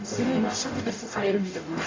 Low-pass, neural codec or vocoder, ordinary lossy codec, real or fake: 7.2 kHz; codec, 44.1 kHz, 0.9 kbps, DAC; AAC, 32 kbps; fake